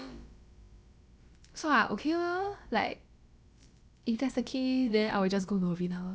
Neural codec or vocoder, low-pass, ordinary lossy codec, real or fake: codec, 16 kHz, about 1 kbps, DyCAST, with the encoder's durations; none; none; fake